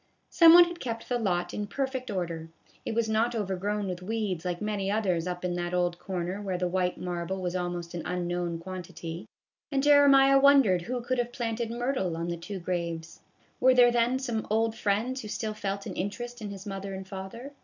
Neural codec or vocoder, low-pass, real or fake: none; 7.2 kHz; real